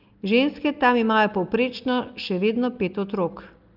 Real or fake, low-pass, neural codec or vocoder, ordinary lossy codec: real; 5.4 kHz; none; Opus, 32 kbps